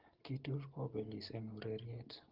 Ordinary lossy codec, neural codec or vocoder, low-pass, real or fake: Opus, 16 kbps; none; 5.4 kHz; real